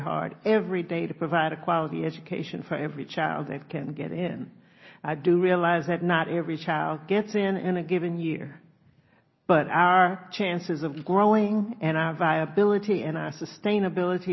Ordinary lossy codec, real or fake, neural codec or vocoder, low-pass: MP3, 24 kbps; real; none; 7.2 kHz